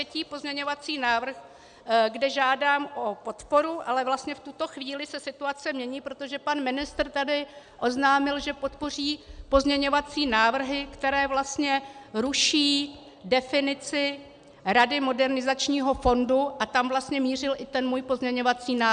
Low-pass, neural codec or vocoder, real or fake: 9.9 kHz; none; real